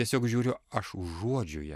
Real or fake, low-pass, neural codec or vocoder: real; 14.4 kHz; none